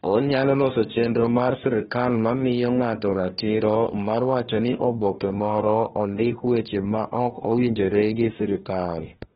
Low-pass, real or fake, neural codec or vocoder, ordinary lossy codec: 7.2 kHz; fake; codec, 16 kHz, 1 kbps, FunCodec, trained on Chinese and English, 50 frames a second; AAC, 16 kbps